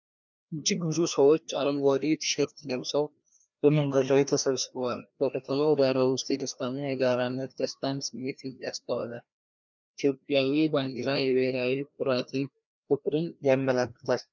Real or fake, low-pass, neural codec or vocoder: fake; 7.2 kHz; codec, 16 kHz, 1 kbps, FreqCodec, larger model